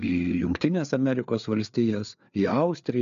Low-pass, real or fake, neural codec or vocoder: 7.2 kHz; fake; codec, 16 kHz, 4 kbps, FreqCodec, larger model